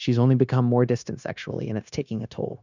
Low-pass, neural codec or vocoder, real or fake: 7.2 kHz; codec, 16 kHz, 0.9 kbps, LongCat-Audio-Codec; fake